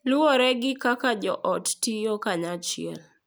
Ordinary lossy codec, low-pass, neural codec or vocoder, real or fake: none; none; none; real